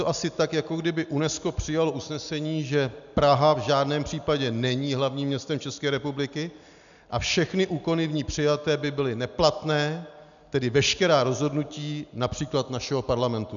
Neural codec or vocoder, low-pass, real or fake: none; 7.2 kHz; real